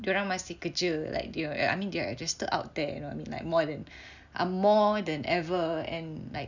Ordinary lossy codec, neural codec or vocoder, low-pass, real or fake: none; none; 7.2 kHz; real